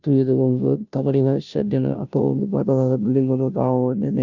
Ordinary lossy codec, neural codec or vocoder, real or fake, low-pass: none; codec, 16 kHz, 0.5 kbps, FunCodec, trained on Chinese and English, 25 frames a second; fake; 7.2 kHz